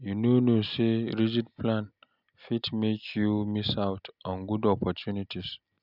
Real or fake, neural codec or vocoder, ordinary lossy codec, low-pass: real; none; none; 5.4 kHz